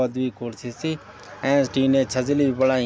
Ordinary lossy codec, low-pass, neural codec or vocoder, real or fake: none; none; none; real